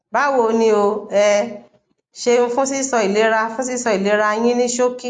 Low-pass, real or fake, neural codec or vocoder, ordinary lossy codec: 9.9 kHz; real; none; AAC, 64 kbps